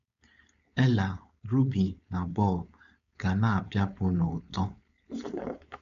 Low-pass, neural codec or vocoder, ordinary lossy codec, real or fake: 7.2 kHz; codec, 16 kHz, 4.8 kbps, FACodec; none; fake